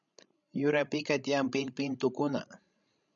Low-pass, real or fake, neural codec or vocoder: 7.2 kHz; fake; codec, 16 kHz, 16 kbps, FreqCodec, larger model